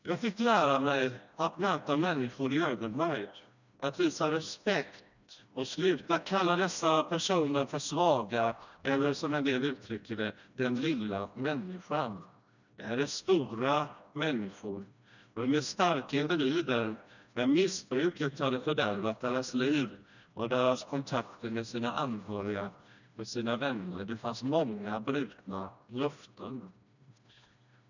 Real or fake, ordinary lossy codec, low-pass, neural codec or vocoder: fake; none; 7.2 kHz; codec, 16 kHz, 1 kbps, FreqCodec, smaller model